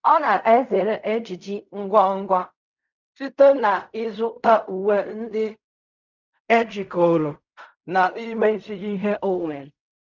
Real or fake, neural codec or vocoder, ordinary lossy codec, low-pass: fake; codec, 16 kHz in and 24 kHz out, 0.4 kbps, LongCat-Audio-Codec, fine tuned four codebook decoder; none; 7.2 kHz